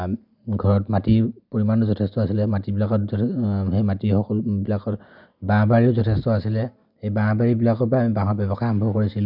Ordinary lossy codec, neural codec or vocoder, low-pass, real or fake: none; none; 5.4 kHz; real